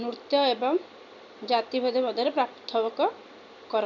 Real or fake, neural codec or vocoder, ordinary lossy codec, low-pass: real; none; none; 7.2 kHz